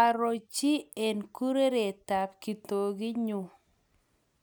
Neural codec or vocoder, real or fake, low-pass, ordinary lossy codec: none; real; none; none